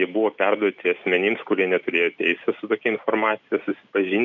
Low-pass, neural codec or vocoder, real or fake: 7.2 kHz; none; real